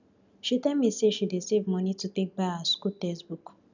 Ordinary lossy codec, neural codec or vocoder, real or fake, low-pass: none; none; real; 7.2 kHz